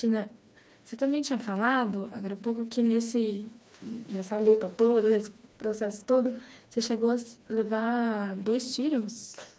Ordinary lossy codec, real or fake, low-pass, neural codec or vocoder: none; fake; none; codec, 16 kHz, 2 kbps, FreqCodec, smaller model